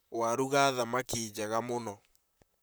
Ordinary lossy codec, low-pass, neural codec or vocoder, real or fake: none; none; vocoder, 44.1 kHz, 128 mel bands, Pupu-Vocoder; fake